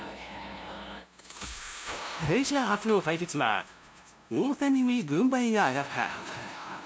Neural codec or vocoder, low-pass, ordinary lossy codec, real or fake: codec, 16 kHz, 0.5 kbps, FunCodec, trained on LibriTTS, 25 frames a second; none; none; fake